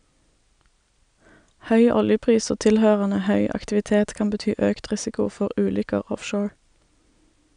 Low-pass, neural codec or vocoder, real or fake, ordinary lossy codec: 9.9 kHz; none; real; none